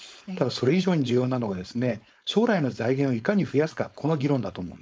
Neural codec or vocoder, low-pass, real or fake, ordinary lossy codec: codec, 16 kHz, 4.8 kbps, FACodec; none; fake; none